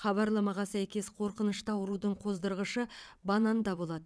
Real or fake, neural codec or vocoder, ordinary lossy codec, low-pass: fake; vocoder, 22.05 kHz, 80 mel bands, WaveNeXt; none; none